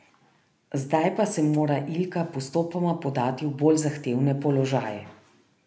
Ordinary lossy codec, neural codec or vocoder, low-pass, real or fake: none; none; none; real